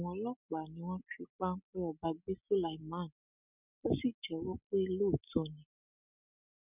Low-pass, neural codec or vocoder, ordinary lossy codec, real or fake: 3.6 kHz; none; none; real